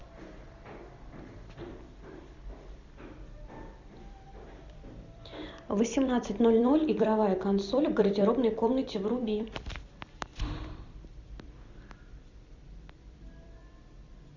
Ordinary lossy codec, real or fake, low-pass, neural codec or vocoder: Opus, 64 kbps; fake; 7.2 kHz; vocoder, 44.1 kHz, 128 mel bands every 256 samples, BigVGAN v2